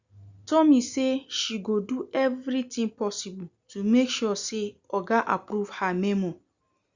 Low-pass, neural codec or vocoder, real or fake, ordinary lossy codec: 7.2 kHz; none; real; Opus, 64 kbps